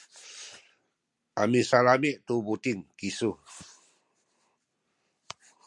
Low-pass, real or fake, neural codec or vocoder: 10.8 kHz; real; none